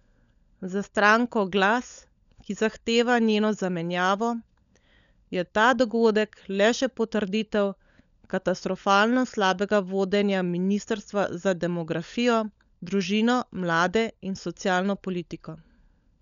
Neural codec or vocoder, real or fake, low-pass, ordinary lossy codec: codec, 16 kHz, 16 kbps, FunCodec, trained on LibriTTS, 50 frames a second; fake; 7.2 kHz; none